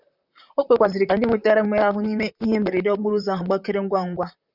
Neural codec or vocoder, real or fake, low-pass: vocoder, 44.1 kHz, 128 mel bands, Pupu-Vocoder; fake; 5.4 kHz